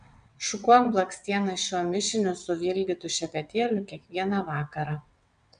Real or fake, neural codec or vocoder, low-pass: fake; vocoder, 22.05 kHz, 80 mel bands, WaveNeXt; 9.9 kHz